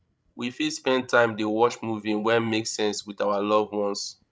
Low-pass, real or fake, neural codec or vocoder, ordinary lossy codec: none; fake; codec, 16 kHz, 16 kbps, FreqCodec, larger model; none